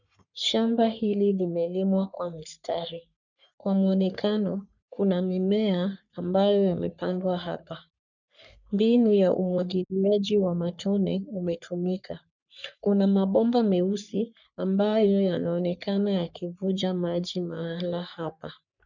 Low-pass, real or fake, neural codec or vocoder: 7.2 kHz; fake; codec, 44.1 kHz, 3.4 kbps, Pupu-Codec